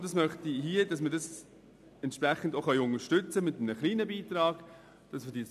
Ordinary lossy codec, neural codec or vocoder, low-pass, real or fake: MP3, 96 kbps; none; 14.4 kHz; real